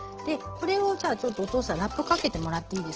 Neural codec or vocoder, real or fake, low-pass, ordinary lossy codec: none; real; 7.2 kHz; Opus, 16 kbps